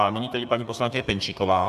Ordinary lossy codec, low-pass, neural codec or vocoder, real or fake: AAC, 96 kbps; 14.4 kHz; codec, 32 kHz, 1.9 kbps, SNAC; fake